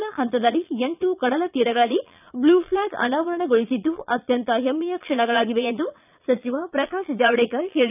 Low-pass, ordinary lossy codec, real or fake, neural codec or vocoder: 3.6 kHz; none; fake; vocoder, 22.05 kHz, 80 mel bands, Vocos